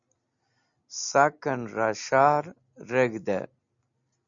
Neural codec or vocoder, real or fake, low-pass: none; real; 7.2 kHz